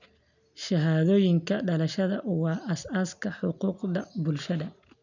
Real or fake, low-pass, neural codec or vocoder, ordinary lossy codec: real; 7.2 kHz; none; none